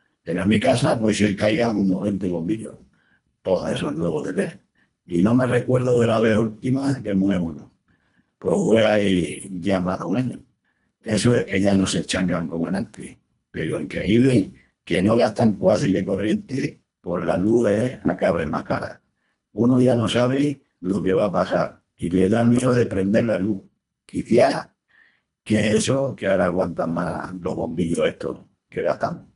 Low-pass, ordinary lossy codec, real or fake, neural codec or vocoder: 10.8 kHz; none; fake; codec, 24 kHz, 1.5 kbps, HILCodec